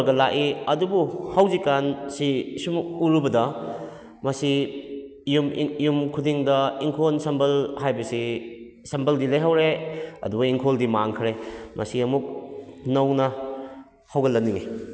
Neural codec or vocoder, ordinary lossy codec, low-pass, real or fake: none; none; none; real